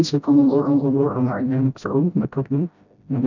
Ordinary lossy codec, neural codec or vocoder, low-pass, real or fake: none; codec, 16 kHz, 0.5 kbps, FreqCodec, smaller model; 7.2 kHz; fake